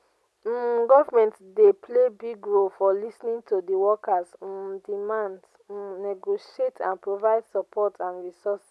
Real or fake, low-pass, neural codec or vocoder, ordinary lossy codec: real; none; none; none